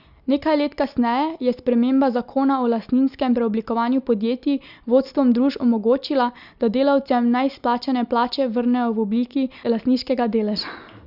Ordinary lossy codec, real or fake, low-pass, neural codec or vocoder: Opus, 64 kbps; real; 5.4 kHz; none